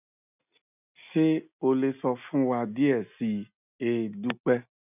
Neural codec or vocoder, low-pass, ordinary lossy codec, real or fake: none; 3.6 kHz; none; real